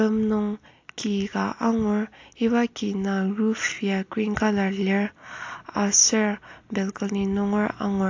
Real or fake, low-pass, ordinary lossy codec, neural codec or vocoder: real; 7.2 kHz; AAC, 48 kbps; none